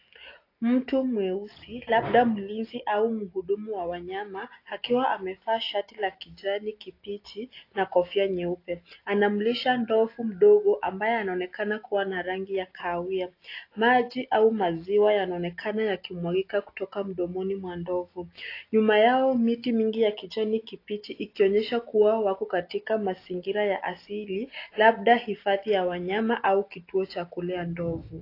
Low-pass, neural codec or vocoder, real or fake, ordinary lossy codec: 5.4 kHz; none; real; AAC, 32 kbps